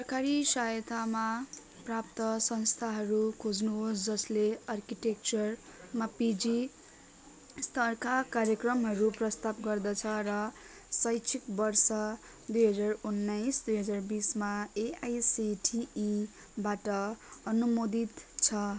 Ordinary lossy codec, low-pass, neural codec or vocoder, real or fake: none; none; none; real